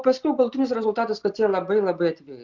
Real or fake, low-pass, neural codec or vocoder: real; 7.2 kHz; none